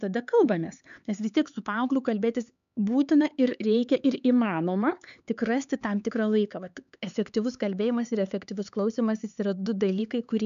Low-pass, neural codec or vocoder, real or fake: 7.2 kHz; codec, 16 kHz, 4 kbps, X-Codec, HuBERT features, trained on LibriSpeech; fake